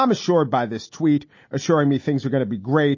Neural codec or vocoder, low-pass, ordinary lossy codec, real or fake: none; 7.2 kHz; MP3, 32 kbps; real